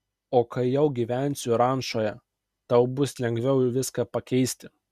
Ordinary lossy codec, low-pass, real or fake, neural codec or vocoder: Opus, 64 kbps; 14.4 kHz; real; none